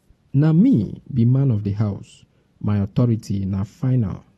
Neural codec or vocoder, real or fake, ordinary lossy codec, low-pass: none; real; AAC, 32 kbps; 19.8 kHz